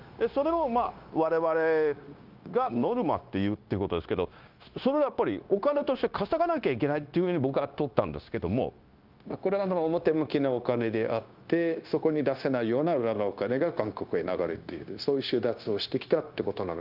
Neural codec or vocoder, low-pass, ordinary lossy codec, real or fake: codec, 16 kHz, 0.9 kbps, LongCat-Audio-Codec; 5.4 kHz; Opus, 24 kbps; fake